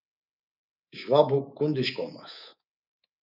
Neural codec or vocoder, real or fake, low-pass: codec, 24 kHz, 3.1 kbps, DualCodec; fake; 5.4 kHz